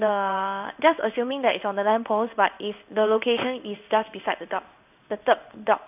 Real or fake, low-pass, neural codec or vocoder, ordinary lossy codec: fake; 3.6 kHz; codec, 16 kHz in and 24 kHz out, 1 kbps, XY-Tokenizer; none